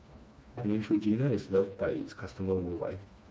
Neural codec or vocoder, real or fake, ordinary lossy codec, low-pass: codec, 16 kHz, 2 kbps, FreqCodec, smaller model; fake; none; none